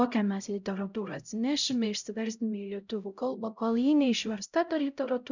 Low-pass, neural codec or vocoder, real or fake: 7.2 kHz; codec, 16 kHz, 0.5 kbps, X-Codec, HuBERT features, trained on LibriSpeech; fake